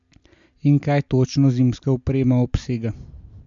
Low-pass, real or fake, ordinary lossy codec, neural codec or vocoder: 7.2 kHz; real; MP3, 64 kbps; none